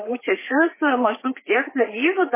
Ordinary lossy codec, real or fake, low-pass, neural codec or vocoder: MP3, 16 kbps; fake; 3.6 kHz; codec, 16 kHz, 4 kbps, FreqCodec, larger model